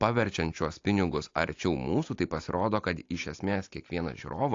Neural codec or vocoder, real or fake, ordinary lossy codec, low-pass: none; real; AAC, 48 kbps; 7.2 kHz